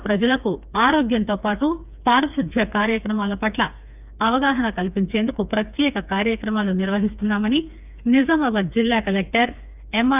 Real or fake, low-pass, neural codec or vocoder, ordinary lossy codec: fake; 3.6 kHz; codec, 16 kHz, 4 kbps, FreqCodec, smaller model; none